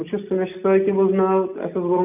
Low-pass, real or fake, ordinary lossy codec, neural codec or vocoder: 3.6 kHz; real; none; none